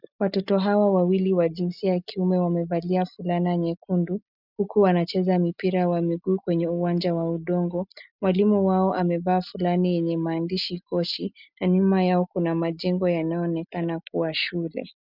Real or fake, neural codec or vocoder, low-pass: real; none; 5.4 kHz